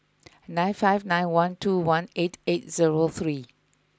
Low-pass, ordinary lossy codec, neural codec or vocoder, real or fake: none; none; none; real